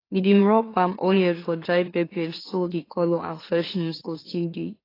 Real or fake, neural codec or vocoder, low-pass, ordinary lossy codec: fake; autoencoder, 44.1 kHz, a latent of 192 numbers a frame, MeloTTS; 5.4 kHz; AAC, 24 kbps